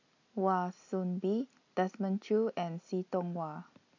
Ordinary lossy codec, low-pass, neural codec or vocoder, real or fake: AAC, 48 kbps; 7.2 kHz; none; real